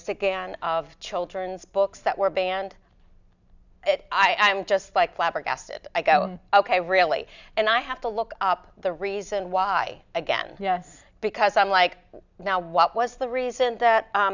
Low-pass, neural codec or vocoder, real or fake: 7.2 kHz; none; real